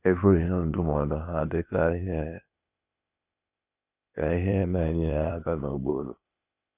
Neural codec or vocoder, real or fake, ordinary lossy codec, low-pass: codec, 16 kHz, 0.8 kbps, ZipCodec; fake; none; 3.6 kHz